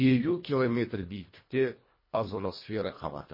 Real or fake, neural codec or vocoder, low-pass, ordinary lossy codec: fake; codec, 24 kHz, 1.5 kbps, HILCodec; 5.4 kHz; MP3, 24 kbps